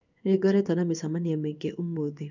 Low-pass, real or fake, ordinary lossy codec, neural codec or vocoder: 7.2 kHz; fake; none; codec, 16 kHz in and 24 kHz out, 1 kbps, XY-Tokenizer